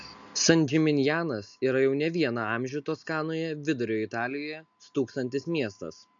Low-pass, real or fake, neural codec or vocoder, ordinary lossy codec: 7.2 kHz; real; none; MP3, 64 kbps